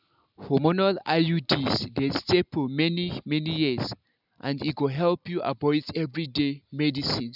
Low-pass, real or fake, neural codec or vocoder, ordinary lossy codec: 5.4 kHz; real; none; none